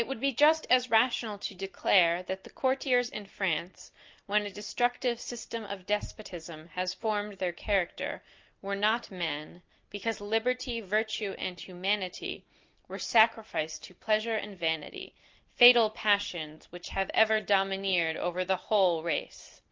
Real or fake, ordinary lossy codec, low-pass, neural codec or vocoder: real; Opus, 32 kbps; 7.2 kHz; none